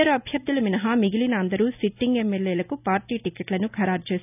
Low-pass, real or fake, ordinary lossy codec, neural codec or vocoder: 3.6 kHz; real; none; none